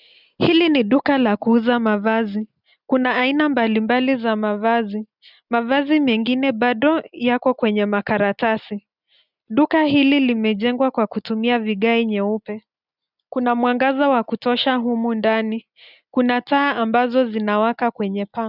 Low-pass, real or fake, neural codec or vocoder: 5.4 kHz; real; none